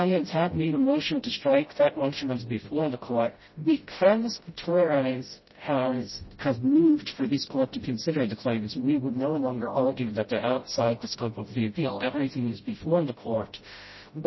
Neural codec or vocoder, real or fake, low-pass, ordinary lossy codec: codec, 16 kHz, 0.5 kbps, FreqCodec, smaller model; fake; 7.2 kHz; MP3, 24 kbps